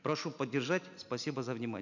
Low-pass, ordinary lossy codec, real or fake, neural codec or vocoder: 7.2 kHz; none; real; none